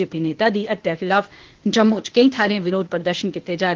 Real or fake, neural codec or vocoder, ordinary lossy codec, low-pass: fake; codec, 16 kHz, 0.8 kbps, ZipCodec; Opus, 16 kbps; 7.2 kHz